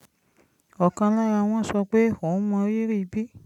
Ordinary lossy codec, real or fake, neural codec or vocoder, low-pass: none; real; none; 19.8 kHz